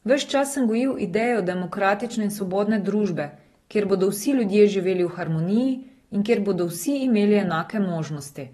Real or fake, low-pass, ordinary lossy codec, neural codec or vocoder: real; 14.4 kHz; AAC, 32 kbps; none